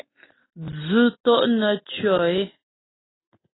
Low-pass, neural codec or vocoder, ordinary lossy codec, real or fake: 7.2 kHz; none; AAC, 16 kbps; real